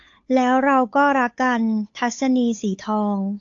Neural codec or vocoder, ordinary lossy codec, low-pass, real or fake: codec, 16 kHz, 8 kbps, FunCodec, trained on Chinese and English, 25 frames a second; AAC, 48 kbps; 7.2 kHz; fake